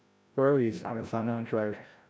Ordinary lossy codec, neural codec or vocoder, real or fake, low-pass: none; codec, 16 kHz, 0.5 kbps, FreqCodec, larger model; fake; none